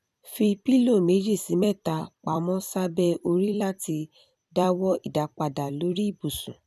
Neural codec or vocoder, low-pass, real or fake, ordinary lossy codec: vocoder, 44.1 kHz, 128 mel bands every 256 samples, BigVGAN v2; 14.4 kHz; fake; none